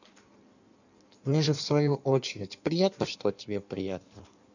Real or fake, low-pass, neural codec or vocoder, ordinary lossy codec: fake; 7.2 kHz; codec, 16 kHz in and 24 kHz out, 1.1 kbps, FireRedTTS-2 codec; none